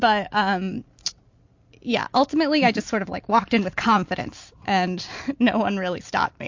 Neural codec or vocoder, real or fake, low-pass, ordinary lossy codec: none; real; 7.2 kHz; MP3, 48 kbps